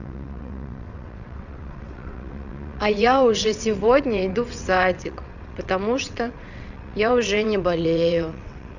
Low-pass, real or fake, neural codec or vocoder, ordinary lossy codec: 7.2 kHz; fake; vocoder, 22.05 kHz, 80 mel bands, WaveNeXt; none